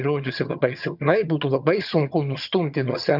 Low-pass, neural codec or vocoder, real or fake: 5.4 kHz; vocoder, 22.05 kHz, 80 mel bands, HiFi-GAN; fake